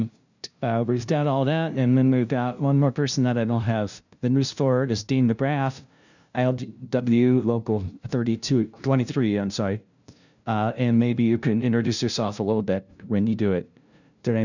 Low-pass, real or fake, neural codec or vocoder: 7.2 kHz; fake; codec, 16 kHz, 0.5 kbps, FunCodec, trained on LibriTTS, 25 frames a second